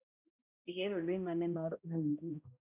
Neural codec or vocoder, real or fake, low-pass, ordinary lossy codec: codec, 16 kHz, 0.5 kbps, X-Codec, HuBERT features, trained on balanced general audio; fake; 3.6 kHz; AAC, 32 kbps